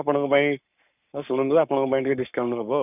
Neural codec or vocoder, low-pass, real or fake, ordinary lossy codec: codec, 44.1 kHz, 7.8 kbps, Pupu-Codec; 3.6 kHz; fake; none